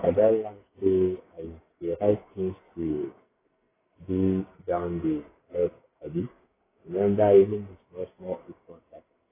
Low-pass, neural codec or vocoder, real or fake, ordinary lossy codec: 3.6 kHz; codec, 44.1 kHz, 2.6 kbps, SNAC; fake; AAC, 16 kbps